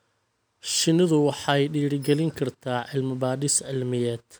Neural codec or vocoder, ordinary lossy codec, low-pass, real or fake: none; none; none; real